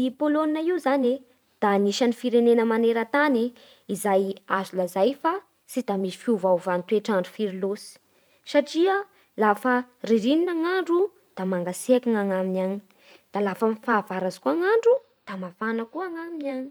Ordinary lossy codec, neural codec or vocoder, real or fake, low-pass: none; vocoder, 44.1 kHz, 128 mel bands every 512 samples, BigVGAN v2; fake; none